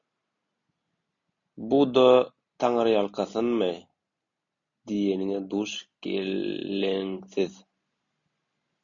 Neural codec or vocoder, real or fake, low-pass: none; real; 7.2 kHz